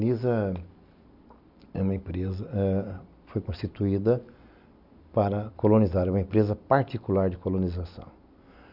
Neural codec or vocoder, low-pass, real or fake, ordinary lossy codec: none; 5.4 kHz; real; none